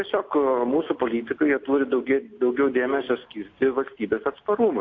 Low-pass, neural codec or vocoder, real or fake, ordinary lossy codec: 7.2 kHz; none; real; AAC, 48 kbps